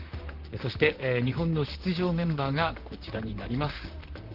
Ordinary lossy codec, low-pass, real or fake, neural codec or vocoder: Opus, 16 kbps; 5.4 kHz; fake; vocoder, 44.1 kHz, 128 mel bands, Pupu-Vocoder